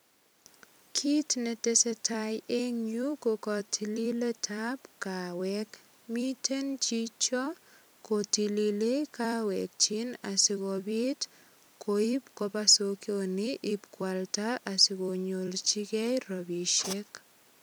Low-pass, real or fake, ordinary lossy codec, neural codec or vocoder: none; fake; none; vocoder, 44.1 kHz, 128 mel bands every 256 samples, BigVGAN v2